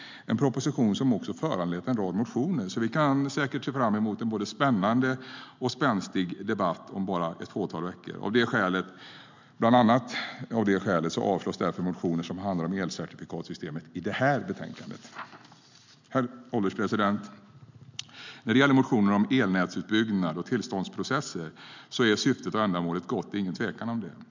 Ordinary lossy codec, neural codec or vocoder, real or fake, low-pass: MP3, 64 kbps; none; real; 7.2 kHz